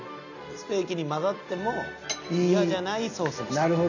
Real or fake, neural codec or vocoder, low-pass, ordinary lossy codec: real; none; 7.2 kHz; none